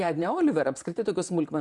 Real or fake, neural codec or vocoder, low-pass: fake; vocoder, 44.1 kHz, 128 mel bands, Pupu-Vocoder; 10.8 kHz